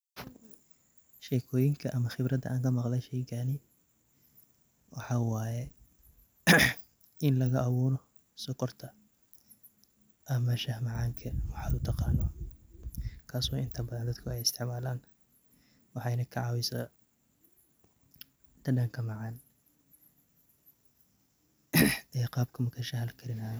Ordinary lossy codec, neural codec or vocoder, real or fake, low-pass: none; none; real; none